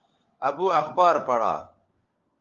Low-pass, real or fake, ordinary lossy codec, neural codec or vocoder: 7.2 kHz; fake; Opus, 32 kbps; codec, 16 kHz, 4 kbps, FunCodec, trained on LibriTTS, 50 frames a second